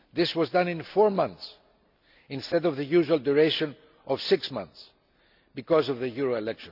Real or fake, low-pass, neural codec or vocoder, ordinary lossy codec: real; 5.4 kHz; none; none